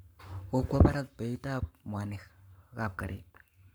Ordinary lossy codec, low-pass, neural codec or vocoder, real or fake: none; none; codec, 44.1 kHz, 7.8 kbps, Pupu-Codec; fake